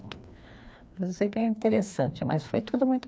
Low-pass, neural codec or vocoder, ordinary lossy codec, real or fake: none; codec, 16 kHz, 2 kbps, FreqCodec, larger model; none; fake